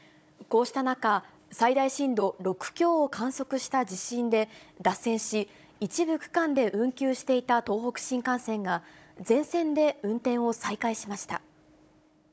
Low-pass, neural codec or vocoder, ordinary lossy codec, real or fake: none; codec, 16 kHz, 16 kbps, FunCodec, trained on Chinese and English, 50 frames a second; none; fake